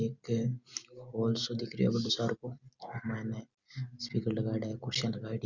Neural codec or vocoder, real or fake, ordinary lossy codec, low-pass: none; real; Opus, 64 kbps; 7.2 kHz